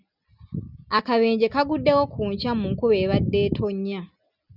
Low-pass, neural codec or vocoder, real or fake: 5.4 kHz; none; real